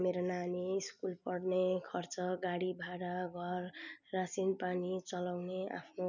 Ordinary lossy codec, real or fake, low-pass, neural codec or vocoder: none; real; 7.2 kHz; none